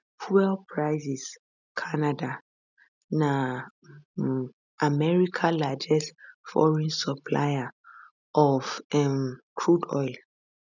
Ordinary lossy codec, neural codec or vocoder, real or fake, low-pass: none; none; real; 7.2 kHz